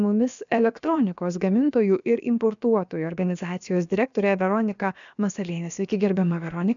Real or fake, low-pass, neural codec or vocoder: fake; 7.2 kHz; codec, 16 kHz, about 1 kbps, DyCAST, with the encoder's durations